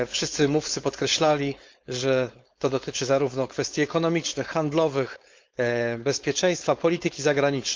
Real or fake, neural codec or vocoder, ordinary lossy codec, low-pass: fake; codec, 16 kHz, 4.8 kbps, FACodec; Opus, 32 kbps; 7.2 kHz